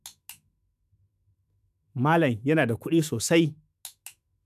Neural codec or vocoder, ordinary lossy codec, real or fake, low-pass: autoencoder, 48 kHz, 128 numbers a frame, DAC-VAE, trained on Japanese speech; none; fake; 14.4 kHz